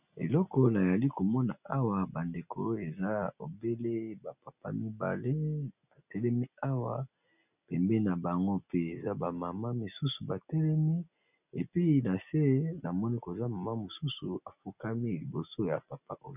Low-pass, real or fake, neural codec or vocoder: 3.6 kHz; real; none